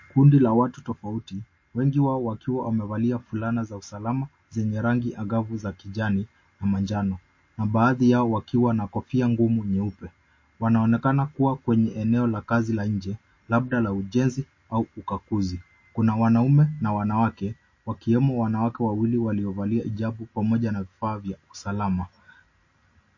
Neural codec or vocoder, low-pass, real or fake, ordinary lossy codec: none; 7.2 kHz; real; MP3, 32 kbps